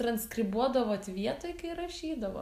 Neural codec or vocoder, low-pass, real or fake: none; 14.4 kHz; real